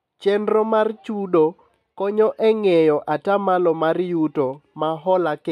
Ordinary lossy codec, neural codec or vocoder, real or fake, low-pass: none; none; real; 14.4 kHz